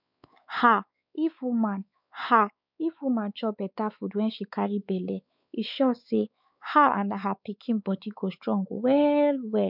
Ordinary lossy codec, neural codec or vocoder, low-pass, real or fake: none; codec, 16 kHz, 4 kbps, X-Codec, WavLM features, trained on Multilingual LibriSpeech; 5.4 kHz; fake